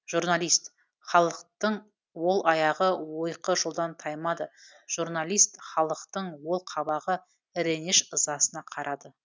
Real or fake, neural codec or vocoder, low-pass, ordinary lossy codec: real; none; none; none